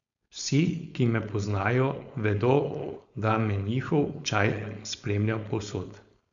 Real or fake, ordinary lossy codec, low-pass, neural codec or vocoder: fake; none; 7.2 kHz; codec, 16 kHz, 4.8 kbps, FACodec